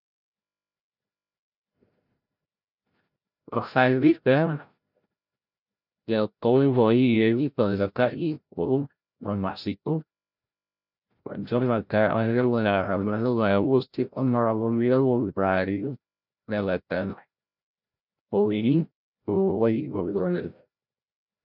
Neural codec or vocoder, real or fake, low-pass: codec, 16 kHz, 0.5 kbps, FreqCodec, larger model; fake; 5.4 kHz